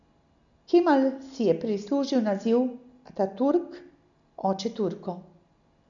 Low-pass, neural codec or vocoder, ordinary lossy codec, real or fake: 7.2 kHz; none; none; real